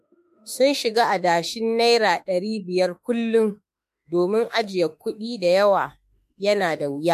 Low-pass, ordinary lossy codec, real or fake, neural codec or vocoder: 14.4 kHz; MP3, 64 kbps; fake; autoencoder, 48 kHz, 32 numbers a frame, DAC-VAE, trained on Japanese speech